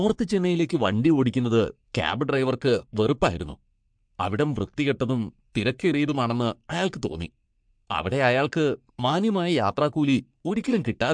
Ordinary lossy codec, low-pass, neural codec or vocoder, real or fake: MP3, 64 kbps; 9.9 kHz; codec, 44.1 kHz, 3.4 kbps, Pupu-Codec; fake